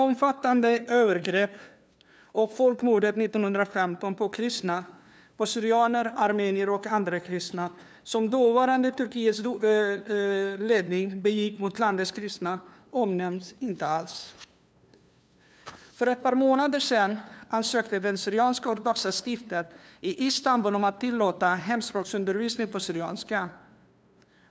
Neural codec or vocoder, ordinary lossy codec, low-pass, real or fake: codec, 16 kHz, 2 kbps, FunCodec, trained on LibriTTS, 25 frames a second; none; none; fake